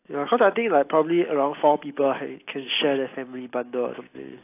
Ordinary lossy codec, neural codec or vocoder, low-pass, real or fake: AAC, 24 kbps; none; 3.6 kHz; real